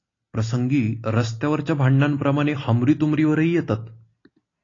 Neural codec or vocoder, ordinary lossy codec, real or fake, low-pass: none; AAC, 32 kbps; real; 7.2 kHz